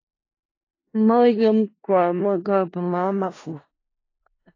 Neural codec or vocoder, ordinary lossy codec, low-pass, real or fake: codec, 16 kHz in and 24 kHz out, 0.4 kbps, LongCat-Audio-Codec, four codebook decoder; AAC, 32 kbps; 7.2 kHz; fake